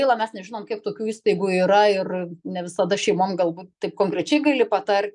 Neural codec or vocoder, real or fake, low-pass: none; real; 10.8 kHz